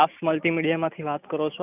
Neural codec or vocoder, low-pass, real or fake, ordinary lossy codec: none; 3.6 kHz; real; none